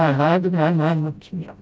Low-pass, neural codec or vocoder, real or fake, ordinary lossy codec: none; codec, 16 kHz, 0.5 kbps, FreqCodec, smaller model; fake; none